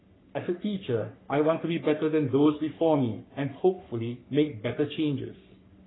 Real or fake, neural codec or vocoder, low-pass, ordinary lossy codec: fake; codec, 44.1 kHz, 3.4 kbps, Pupu-Codec; 7.2 kHz; AAC, 16 kbps